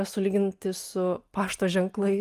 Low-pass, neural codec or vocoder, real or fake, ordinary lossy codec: 14.4 kHz; none; real; Opus, 32 kbps